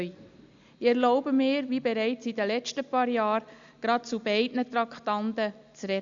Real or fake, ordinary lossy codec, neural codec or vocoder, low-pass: real; Opus, 64 kbps; none; 7.2 kHz